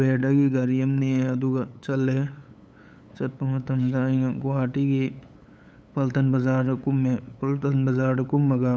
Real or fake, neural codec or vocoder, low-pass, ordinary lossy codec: fake; codec, 16 kHz, 8 kbps, FunCodec, trained on LibriTTS, 25 frames a second; none; none